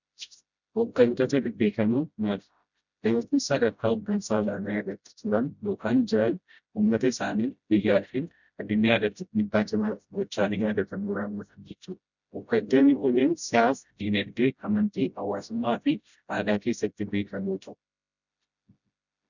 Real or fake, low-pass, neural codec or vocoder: fake; 7.2 kHz; codec, 16 kHz, 0.5 kbps, FreqCodec, smaller model